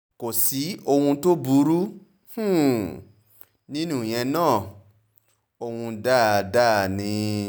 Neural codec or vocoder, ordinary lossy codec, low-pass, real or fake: none; none; none; real